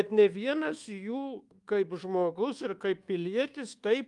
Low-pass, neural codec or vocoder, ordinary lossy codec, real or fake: 10.8 kHz; codec, 24 kHz, 1.2 kbps, DualCodec; Opus, 32 kbps; fake